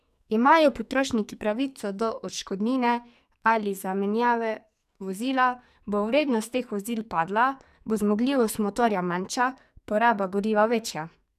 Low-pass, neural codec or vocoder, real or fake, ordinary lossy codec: 14.4 kHz; codec, 44.1 kHz, 2.6 kbps, SNAC; fake; none